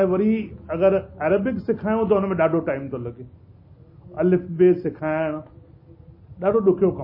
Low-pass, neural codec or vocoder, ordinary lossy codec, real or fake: 5.4 kHz; none; MP3, 24 kbps; real